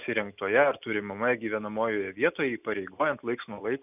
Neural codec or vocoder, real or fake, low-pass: none; real; 3.6 kHz